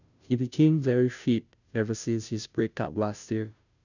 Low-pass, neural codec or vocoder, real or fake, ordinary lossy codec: 7.2 kHz; codec, 16 kHz, 0.5 kbps, FunCodec, trained on Chinese and English, 25 frames a second; fake; none